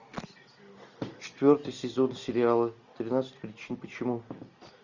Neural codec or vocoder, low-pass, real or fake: none; 7.2 kHz; real